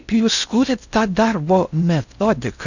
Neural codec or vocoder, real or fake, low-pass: codec, 16 kHz in and 24 kHz out, 0.6 kbps, FocalCodec, streaming, 4096 codes; fake; 7.2 kHz